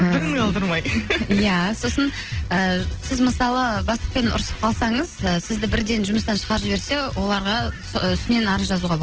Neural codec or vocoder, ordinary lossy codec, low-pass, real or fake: none; Opus, 16 kbps; 7.2 kHz; real